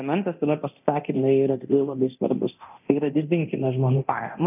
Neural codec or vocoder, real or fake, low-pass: codec, 24 kHz, 0.9 kbps, DualCodec; fake; 3.6 kHz